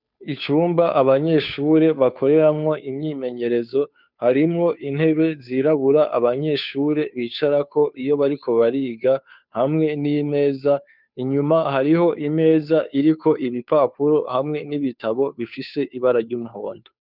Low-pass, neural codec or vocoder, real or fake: 5.4 kHz; codec, 16 kHz, 2 kbps, FunCodec, trained on Chinese and English, 25 frames a second; fake